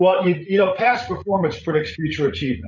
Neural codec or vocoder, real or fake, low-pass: codec, 16 kHz, 8 kbps, FreqCodec, larger model; fake; 7.2 kHz